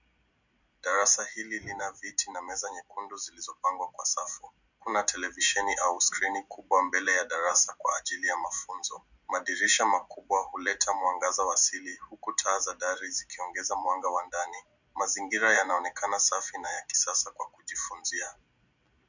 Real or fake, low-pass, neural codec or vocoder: fake; 7.2 kHz; vocoder, 44.1 kHz, 128 mel bands every 256 samples, BigVGAN v2